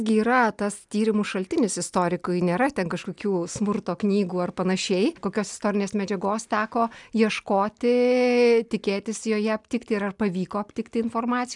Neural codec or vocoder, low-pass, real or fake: none; 10.8 kHz; real